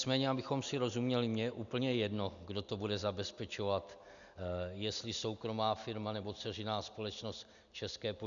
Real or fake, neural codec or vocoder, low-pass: real; none; 7.2 kHz